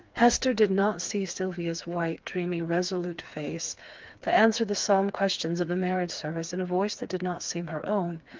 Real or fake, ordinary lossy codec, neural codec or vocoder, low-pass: fake; Opus, 24 kbps; codec, 16 kHz, 4 kbps, FreqCodec, smaller model; 7.2 kHz